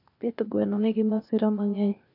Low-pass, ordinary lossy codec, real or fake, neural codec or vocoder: 5.4 kHz; none; fake; codec, 16 kHz, 0.8 kbps, ZipCodec